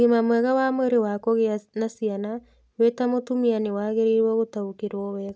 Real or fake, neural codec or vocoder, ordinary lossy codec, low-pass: real; none; none; none